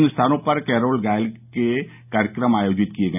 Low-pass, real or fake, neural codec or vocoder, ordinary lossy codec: 3.6 kHz; real; none; none